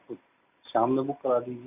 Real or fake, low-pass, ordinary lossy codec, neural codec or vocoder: real; 3.6 kHz; none; none